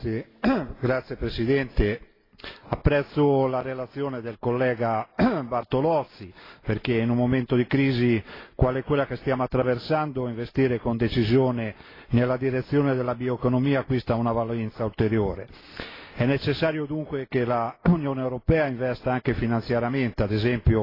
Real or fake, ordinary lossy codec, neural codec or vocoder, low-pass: real; AAC, 24 kbps; none; 5.4 kHz